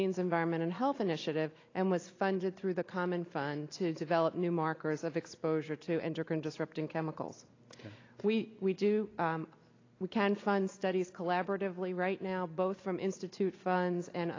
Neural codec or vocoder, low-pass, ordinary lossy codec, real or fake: none; 7.2 kHz; AAC, 32 kbps; real